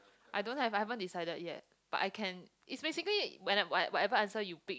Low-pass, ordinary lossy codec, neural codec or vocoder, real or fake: none; none; none; real